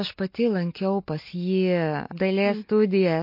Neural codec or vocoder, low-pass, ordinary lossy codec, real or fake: none; 5.4 kHz; MP3, 32 kbps; real